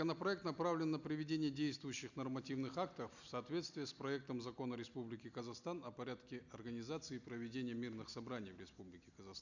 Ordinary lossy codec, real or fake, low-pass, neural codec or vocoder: none; real; 7.2 kHz; none